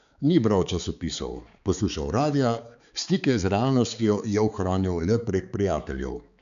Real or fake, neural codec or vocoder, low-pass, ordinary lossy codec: fake; codec, 16 kHz, 4 kbps, X-Codec, HuBERT features, trained on balanced general audio; 7.2 kHz; none